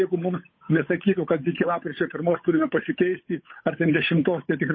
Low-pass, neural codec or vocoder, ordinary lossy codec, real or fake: 7.2 kHz; codec, 16 kHz, 8 kbps, FunCodec, trained on Chinese and English, 25 frames a second; MP3, 24 kbps; fake